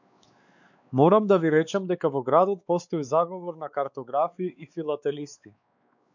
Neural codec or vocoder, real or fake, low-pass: codec, 16 kHz, 4 kbps, X-Codec, WavLM features, trained on Multilingual LibriSpeech; fake; 7.2 kHz